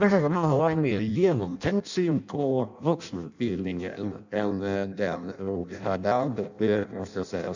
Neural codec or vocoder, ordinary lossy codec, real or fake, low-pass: codec, 16 kHz in and 24 kHz out, 0.6 kbps, FireRedTTS-2 codec; none; fake; 7.2 kHz